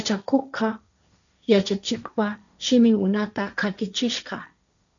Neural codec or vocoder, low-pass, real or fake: codec, 16 kHz, 1.1 kbps, Voila-Tokenizer; 7.2 kHz; fake